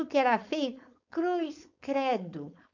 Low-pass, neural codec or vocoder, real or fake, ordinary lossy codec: 7.2 kHz; codec, 16 kHz, 4.8 kbps, FACodec; fake; none